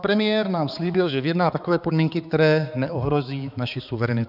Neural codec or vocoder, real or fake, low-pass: codec, 16 kHz, 4 kbps, X-Codec, HuBERT features, trained on balanced general audio; fake; 5.4 kHz